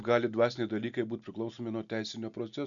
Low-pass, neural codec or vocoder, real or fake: 7.2 kHz; none; real